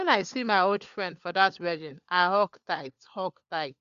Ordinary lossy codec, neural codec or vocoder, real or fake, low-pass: Opus, 64 kbps; codec, 16 kHz, 4 kbps, FunCodec, trained on LibriTTS, 50 frames a second; fake; 7.2 kHz